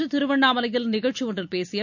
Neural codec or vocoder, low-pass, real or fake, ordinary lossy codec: none; 7.2 kHz; real; none